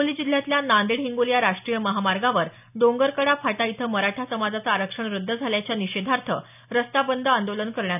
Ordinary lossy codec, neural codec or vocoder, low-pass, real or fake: none; none; 3.6 kHz; real